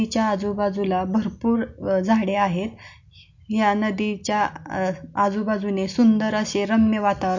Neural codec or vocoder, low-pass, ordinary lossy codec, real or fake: none; 7.2 kHz; MP3, 48 kbps; real